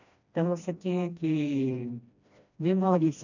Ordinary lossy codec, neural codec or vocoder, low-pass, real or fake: none; codec, 16 kHz, 1 kbps, FreqCodec, smaller model; 7.2 kHz; fake